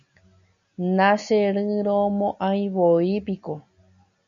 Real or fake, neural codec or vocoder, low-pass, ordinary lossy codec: real; none; 7.2 kHz; MP3, 96 kbps